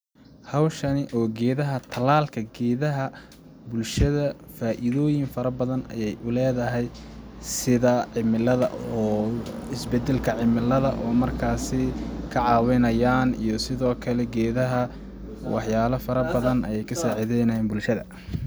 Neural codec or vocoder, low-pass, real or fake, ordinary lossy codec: none; none; real; none